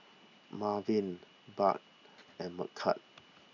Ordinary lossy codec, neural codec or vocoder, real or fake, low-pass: none; none; real; 7.2 kHz